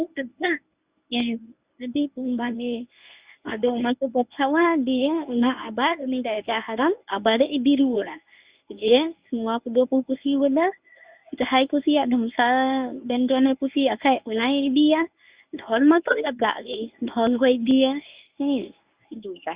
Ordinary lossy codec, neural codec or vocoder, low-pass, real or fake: Opus, 64 kbps; codec, 24 kHz, 0.9 kbps, WavTokenizer, medium speech release version 1; 3.6 kHz; fake